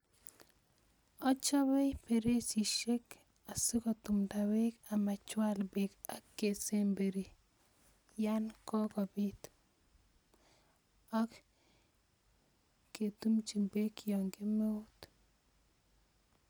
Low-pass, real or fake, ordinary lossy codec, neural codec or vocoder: none; real; none; none